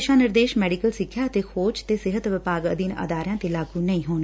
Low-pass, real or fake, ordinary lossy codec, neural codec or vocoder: none; real; none; none